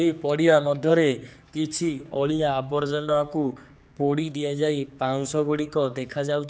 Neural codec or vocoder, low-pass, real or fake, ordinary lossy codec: codec, 16 kHz, 4 kbps, X-Codec, HuBERT features, trained on general audio; none; fake; none